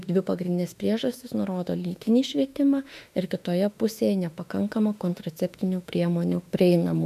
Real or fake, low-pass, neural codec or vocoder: fake; 14.4 kHz; autoencoder, 48 kHz, 32 numbers a frame, DAC-VAE, trained on Japanese speech